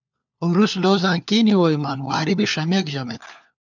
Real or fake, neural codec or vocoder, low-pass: fake; codec, 16 kHz, 4 kbps, FunCodec, trained on LibriTTS, 50 frames a second; 7.2 kHz